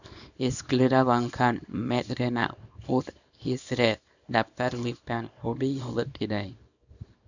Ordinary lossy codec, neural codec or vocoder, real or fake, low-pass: none; codec, 24 kHz, 0.9 kbps, WavTokenizer, small release; fake; 7.2 kHz